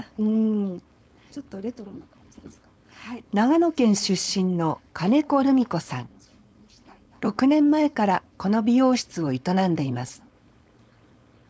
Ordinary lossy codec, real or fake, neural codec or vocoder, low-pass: none; fake; codec, 16 kHz, 4.8 kbps, FACodec; none